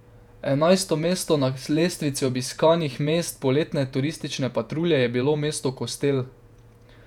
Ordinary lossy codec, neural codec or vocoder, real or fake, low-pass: none; vocoder, 48 kHz, 128 mel bands, Vocos; fake; 19.8 kHz